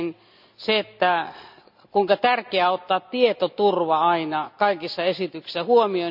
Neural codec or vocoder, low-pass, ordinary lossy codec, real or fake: none; 5.4 kHz; none; real